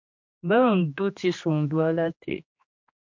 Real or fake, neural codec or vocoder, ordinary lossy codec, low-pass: fake; codec, 16 kHz, 2 kbps, X-Codec, HuBERT features, trained on general audio; MP3, 48 kbps; 7.2 kHz